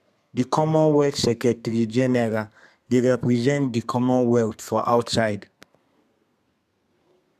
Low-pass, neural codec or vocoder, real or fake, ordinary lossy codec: 14.4 kHz; codec, 32 kHz, 1.9 kbps, SNAC; fake; none